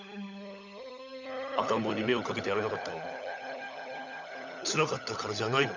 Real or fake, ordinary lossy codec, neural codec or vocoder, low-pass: fake; none; codec, 16 kHz, 16 kbps, FunCodec, trained on LibriTTS, 50 frames a second; 7.2 kHz